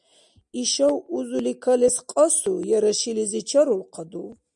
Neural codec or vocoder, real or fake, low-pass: none; real; 10.8 kHz